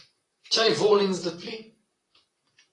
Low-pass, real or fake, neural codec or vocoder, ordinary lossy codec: 10.8 kHz; fake; vocoder, 44.1 kHz, 128 mel bands, Pupu-Vocoder; AAC, 32 kbps